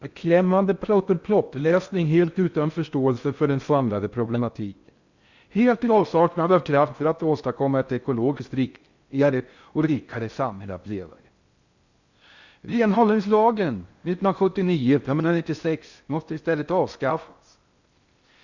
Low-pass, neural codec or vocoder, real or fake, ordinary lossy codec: 7.2 kHz; codec, 16 kHz in and 24 kHz out, 0.6 kbps, FocalCodec, streaming, 4096 codes; fake; Opus, 64 kbps